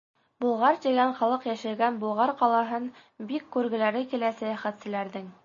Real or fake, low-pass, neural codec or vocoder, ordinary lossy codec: real; 7.2 kHz; none; MP3, 32 kbps